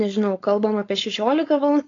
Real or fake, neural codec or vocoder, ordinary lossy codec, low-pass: fake; codec, 16 kHz, 4.8 kbps, FACodec; AAC, 32 kbps; 7.2 kHz